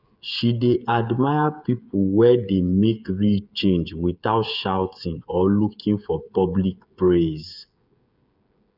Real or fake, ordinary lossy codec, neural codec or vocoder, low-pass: fake; none; codec, 16 kHz, 8 kbps, FreqCodec, smaller model; 5.4 kHz